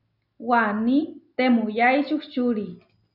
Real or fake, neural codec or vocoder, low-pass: real; none; 5.4 kHz